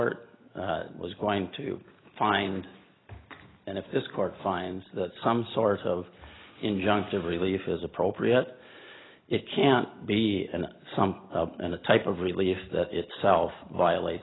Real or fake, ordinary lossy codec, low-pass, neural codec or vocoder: real; AAC, 16 kbps; 7.2 kHz; none